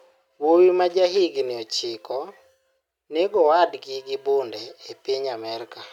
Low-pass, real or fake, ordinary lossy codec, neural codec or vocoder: 19.8 kHz; real; none; none